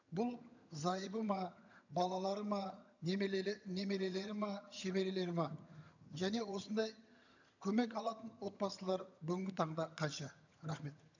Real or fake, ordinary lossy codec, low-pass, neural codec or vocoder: fake; none; 7.2 kHz; vocoder, 22.05 kHz, 80 mel bands, HiFi-GAN